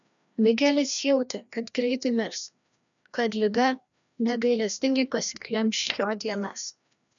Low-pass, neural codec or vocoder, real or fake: 7.2 kHz; codec, 16 kHz, 1 kbps, FreqCodec, larger model; fake